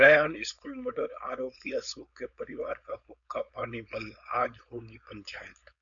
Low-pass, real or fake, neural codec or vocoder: 7.2 kHz; fake; codec, 16 kHz, 4.8 kbps, FACodec